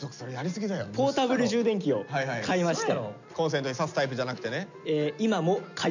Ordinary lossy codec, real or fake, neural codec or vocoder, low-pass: none; real; none; 7.2 kHz